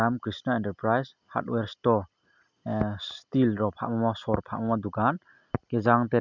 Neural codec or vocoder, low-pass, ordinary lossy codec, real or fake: none; 7.2 kHz; none; real